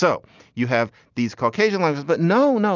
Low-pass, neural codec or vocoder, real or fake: 7.2 kHz; none; real